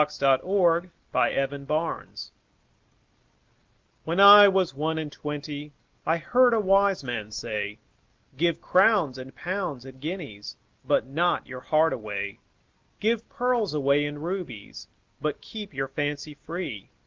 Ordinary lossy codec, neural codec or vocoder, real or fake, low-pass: Opus, 24 kbps; none; real; 7.2 kHz